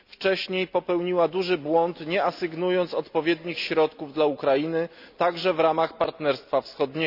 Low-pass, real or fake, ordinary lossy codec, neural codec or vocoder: 5.4 kHz; real; none; none